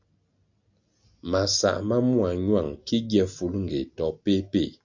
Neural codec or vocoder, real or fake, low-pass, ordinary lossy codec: none; real; 7.2 kHz; AAC, 48 kbps